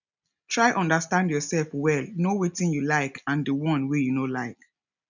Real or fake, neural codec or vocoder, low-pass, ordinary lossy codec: real; none; 7.2 kHz; none